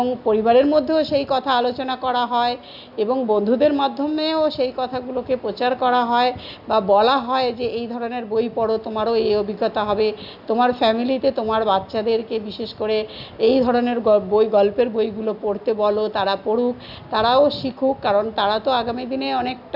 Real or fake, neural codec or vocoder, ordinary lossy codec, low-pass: real; none; none; 5.4 kHz